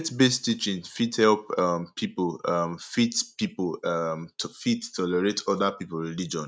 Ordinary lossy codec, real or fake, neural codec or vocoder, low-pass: none; real; none; none